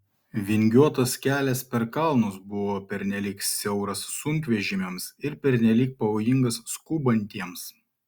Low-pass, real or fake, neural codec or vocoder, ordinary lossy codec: 19.8 kHz; real; none; Opus, 64 kbps